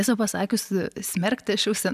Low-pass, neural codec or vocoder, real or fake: 14.4 kHz; none; real